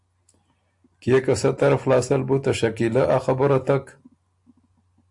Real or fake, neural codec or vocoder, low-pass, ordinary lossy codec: real; none; 10.8 kHz; AAC, 64 kbps